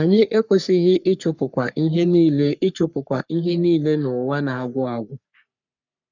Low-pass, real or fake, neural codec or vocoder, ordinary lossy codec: 7.2 kHz; fake; codec, 44.1 kHz, 3.4 kbps, Pupu-Codec; none